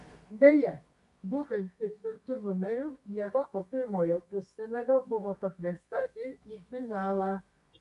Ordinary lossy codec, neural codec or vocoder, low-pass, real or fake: MP3, 64 kbps; codec, 24 kHz, 0.9 kbps, WavTokenizer, medium music audio release; 10.8 kHz; fake